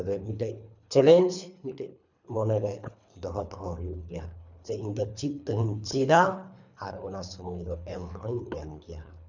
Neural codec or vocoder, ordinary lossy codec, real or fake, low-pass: codec, 24 kHz, 3 kbps, HILCodec; none; fake; 7.2 kHz